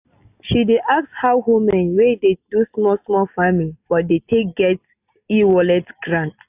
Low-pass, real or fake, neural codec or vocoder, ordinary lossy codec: 3.6 kHz; real; none; AAC, 32 kbps